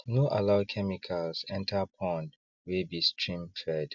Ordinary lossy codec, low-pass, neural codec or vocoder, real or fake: none; 7.2 kHz; none; real